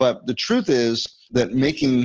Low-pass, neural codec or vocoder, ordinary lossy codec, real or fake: 7.2 kHz; none; Opus, 16 kbps; real